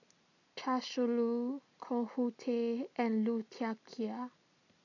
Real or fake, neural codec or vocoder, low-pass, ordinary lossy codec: real; none; 7.2 kHz; none